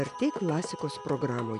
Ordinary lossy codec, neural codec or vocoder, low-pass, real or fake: AAC, 96 kbps; none; 10.8 kHz; real